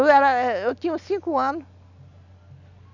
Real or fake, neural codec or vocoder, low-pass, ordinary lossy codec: real; none; 7.2 kHz; none